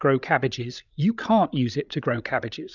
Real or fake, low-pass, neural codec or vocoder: fake; 7.2 kHz; codec, 16 kHz, 8 kbps, FreqCodec, larger model